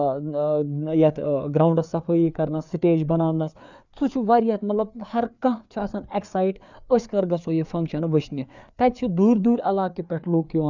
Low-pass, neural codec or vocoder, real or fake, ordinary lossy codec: 7.2 kHz; codec, 16 kHz, 4 kbps, FreqCodec, larger model; fake; none